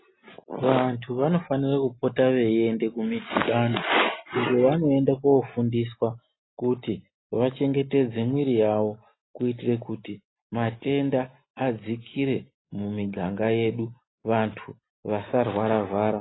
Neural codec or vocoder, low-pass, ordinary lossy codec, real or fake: none; 7.2 kHz; AAC, 16 kbps; real